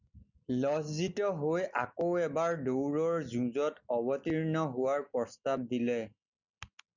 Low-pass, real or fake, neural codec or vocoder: 7.2 kHz; real; none